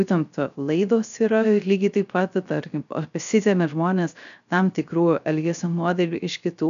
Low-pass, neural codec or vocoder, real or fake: 7.2 kHz; codec, 16 kHz, 0.3 kbps, FocalCodec; fake